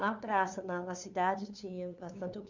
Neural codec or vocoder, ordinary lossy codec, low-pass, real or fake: codec, 16 kHz, 2 kbps, FunCodec, trained on LibriTTS, 25 frames a second; none; 7.2 kHz; fake